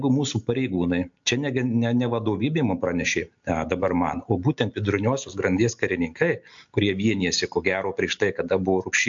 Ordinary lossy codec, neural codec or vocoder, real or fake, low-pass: AAC, 64 kbps; none; real; 7.2 kHz